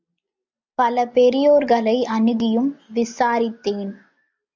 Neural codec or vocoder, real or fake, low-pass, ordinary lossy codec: none; real; 7.2 kHz; MP3, 64 kbps